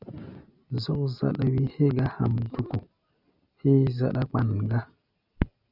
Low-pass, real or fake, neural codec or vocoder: 5.4 kHz; real; none